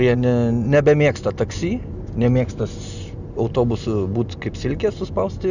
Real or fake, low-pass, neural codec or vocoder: real; 7.2 kHz; none